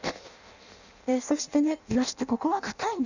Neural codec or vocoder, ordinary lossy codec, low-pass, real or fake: codec, 16 kHz in and 24 kHz out, 0.6 kbps, FireRedTTS-2 codec; none; 7.2 kHz; fake